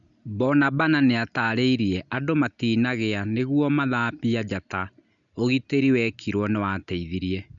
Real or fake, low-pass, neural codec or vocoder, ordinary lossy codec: real; 7.2 kHz; none; none